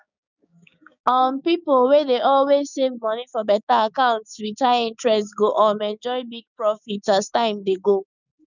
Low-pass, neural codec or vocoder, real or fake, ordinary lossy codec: 7.2 kHz; codec, 16 kHz, 6 kbps, DAC; fake; none